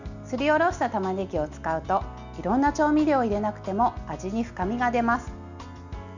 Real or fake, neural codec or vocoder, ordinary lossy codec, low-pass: real; none; none; 7.2 kHz